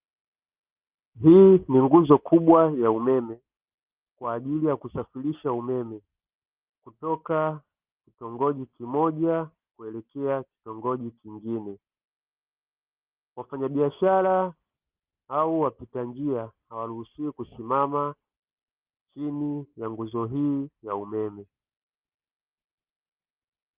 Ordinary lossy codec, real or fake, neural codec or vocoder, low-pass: Opus, 32 kbps; real; none; 3.6 kHz